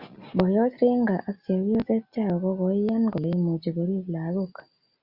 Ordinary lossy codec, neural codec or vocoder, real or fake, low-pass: Opus, 64 kbps; none; real; 5.4 kHz